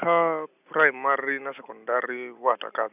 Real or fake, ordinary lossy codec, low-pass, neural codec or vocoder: real; none; 3.6 kHz; none